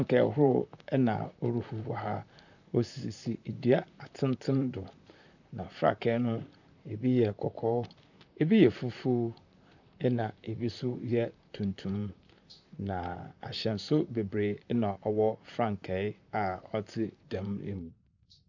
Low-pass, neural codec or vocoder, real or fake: 7.2 kHz; vocoder, 44.1 kHz, 128 mel bands, Pupu-Vocoder; fake